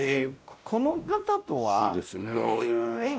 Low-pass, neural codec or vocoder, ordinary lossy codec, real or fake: none; codec, 16 kHz, 1 kbps, X-Codec, WavLM features, trained on Multilingual LibriSpeech; none; fake